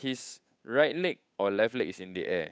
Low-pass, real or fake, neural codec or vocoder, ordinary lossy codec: none; fake; codec, 16 kHz, 8 kbps, FunCodec, trained on Chinese and English, 25 frames a second; none